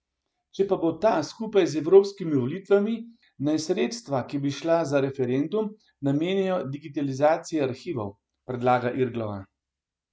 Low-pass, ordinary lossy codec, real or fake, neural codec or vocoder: none; none; real; none